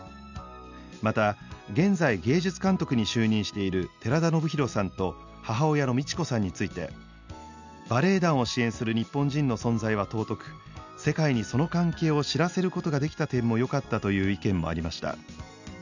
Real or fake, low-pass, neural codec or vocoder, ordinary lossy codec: real; 7.2 kHz; none; none